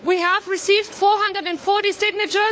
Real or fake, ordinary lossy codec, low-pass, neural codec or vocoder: fake; none; none; codec, 16 kHz, 4 kbps, FunCodec, trained on LibriTTS, 50 frames a second